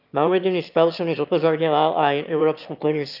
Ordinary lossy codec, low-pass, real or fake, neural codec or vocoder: none; 5.4 kHz; fake; autoencoder, 22.05 kHz, a latent of 192 numbers a frame, VITS, trained on one speaker